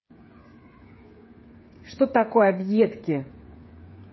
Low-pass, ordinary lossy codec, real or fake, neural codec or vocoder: 7.2 kHz; MP3, 24 kbps; fake; codec, 16 kHz, 8 kbps, FreqCodec, smaller model